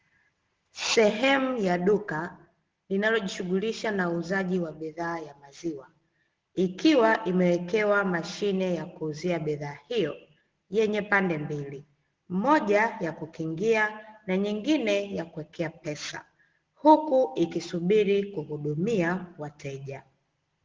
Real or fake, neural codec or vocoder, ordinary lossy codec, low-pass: real; none; Opus, 16 kbps; 7.2 kHz